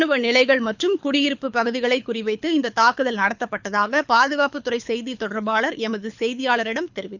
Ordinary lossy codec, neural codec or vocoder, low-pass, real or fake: none; codec, 24 kHz, 6 kbps, HILCodec; 7.2 kHz; fake